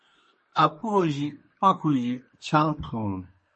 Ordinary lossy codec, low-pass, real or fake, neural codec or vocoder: MP3, 32 kbps; 10.8 kHz; fake; codec, 24 kHz, 1 kbps, SNAC